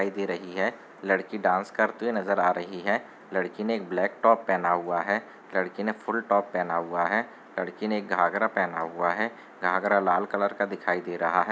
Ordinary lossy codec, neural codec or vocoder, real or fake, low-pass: none; none; real; none